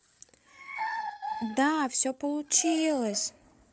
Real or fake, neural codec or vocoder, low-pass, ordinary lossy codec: fake; codec, 16 kHz, 8 kbps, FreqCodec, larger model; none; none